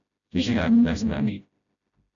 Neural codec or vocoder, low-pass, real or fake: codec, 16 kHz, 0.5 kbps, FreqCodec, smaller model; 7.2 kHz; fake